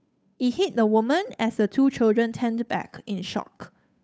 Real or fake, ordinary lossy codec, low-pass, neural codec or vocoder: fake; none; none; codec, 16 kHz, 8 kbps, FunCodec, trained on Chinese and English, 25 frames a second